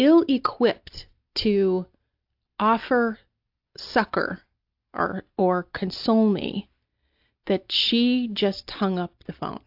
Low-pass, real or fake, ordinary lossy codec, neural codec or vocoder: 5.4 kHz; real; AAC, 48 kbps; none